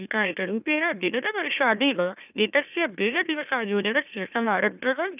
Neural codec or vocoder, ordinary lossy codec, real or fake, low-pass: autoencoder, 44.1 kHz, a latent of 192 numbers a frame, MeloTTS; none; fake; 3.6 kHz